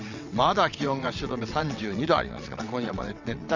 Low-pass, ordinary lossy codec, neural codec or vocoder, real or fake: 7.2 kHz; none; vocoder, 22.05 kHz, 80 mel bands, WaveNeXt; fake